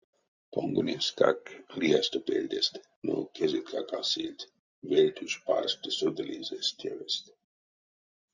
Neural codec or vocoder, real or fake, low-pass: none; real; 7.2 kHz